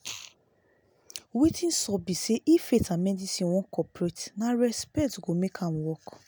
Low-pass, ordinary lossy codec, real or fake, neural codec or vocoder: none; none; real; none